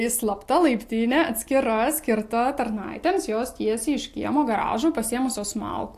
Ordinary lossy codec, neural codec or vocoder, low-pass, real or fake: AAC, 64 kbps; none; 14.4 kHz; real